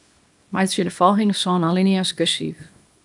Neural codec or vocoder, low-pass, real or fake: codec, 24 kHz, 0.9 kbps, WavTokenizer, small release; 10.8 kHz; fake